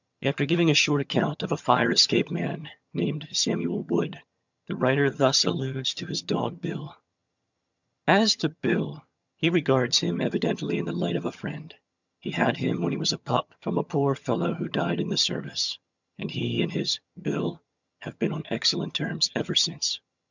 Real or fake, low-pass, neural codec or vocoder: fake; 7.2 kHz; vocoder, 22.05 kHz, 80 mel bands, HiFi-GAN